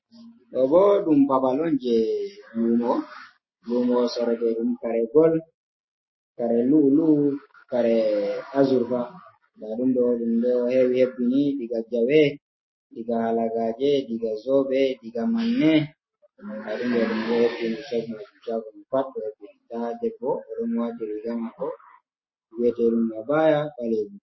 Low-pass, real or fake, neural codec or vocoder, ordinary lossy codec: 7.2 kHz; real; none; MP3, 24 kbps